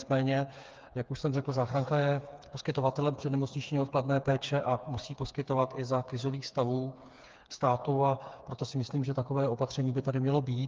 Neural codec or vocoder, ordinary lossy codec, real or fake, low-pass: codec, 16 kHz, 4 kbps, FreqCodec, smaller model; Opus, 24 kbps; fake; 7.2 kHz